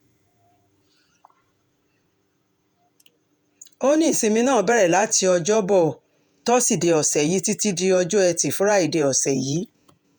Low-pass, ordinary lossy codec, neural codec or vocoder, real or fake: none; none; vocoder, 48 kHz, 128 mel bands, Vocos; fake